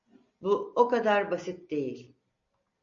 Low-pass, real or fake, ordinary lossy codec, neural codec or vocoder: 7.2 kHz; real; MP3, 48 kbps; none